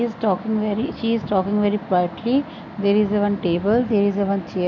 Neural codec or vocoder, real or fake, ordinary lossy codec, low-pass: none; real; none; 7.2 kHz